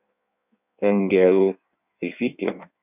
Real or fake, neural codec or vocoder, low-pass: fake; codec, 16 kHz in and 24 kHz out, 1.1 kbps, FireRedTTS-2 codec; 3.6 kHz